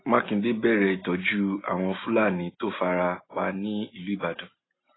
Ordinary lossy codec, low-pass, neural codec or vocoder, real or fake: AAC, 16 kbps; 7.2 kHz; none; real